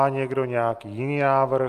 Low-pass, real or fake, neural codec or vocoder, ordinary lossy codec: 14.4 kHz; real; none; Opus, 32 kbps